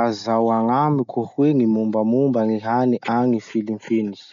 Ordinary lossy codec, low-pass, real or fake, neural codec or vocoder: none; 7.2 kHz; real; none